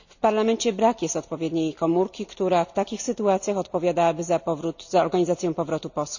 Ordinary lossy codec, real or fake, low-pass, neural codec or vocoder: none; real; 7.2 kHz; none